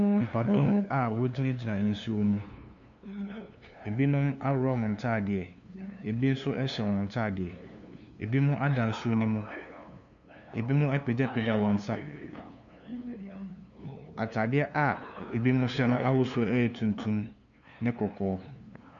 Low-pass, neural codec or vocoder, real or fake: 7.2 kHz; codec, 16 kHz, 2 kbps, FunCodec, trained on LibriTTS, 25 frames a second; fake